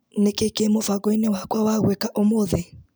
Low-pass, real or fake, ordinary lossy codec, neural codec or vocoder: none; real; none; none